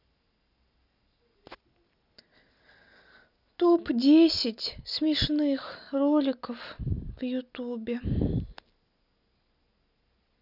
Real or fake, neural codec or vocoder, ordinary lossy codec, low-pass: real; none; none; 5.4 kHz